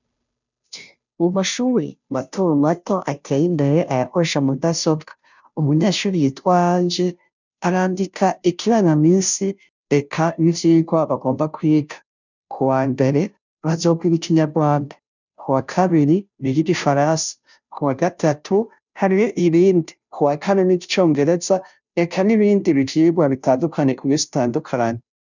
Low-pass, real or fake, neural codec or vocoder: 7.2 kHz; fake; codec, 16 kHz, 0.5 kbps, FunCodec, trained on Chinese and English, 25 frames a second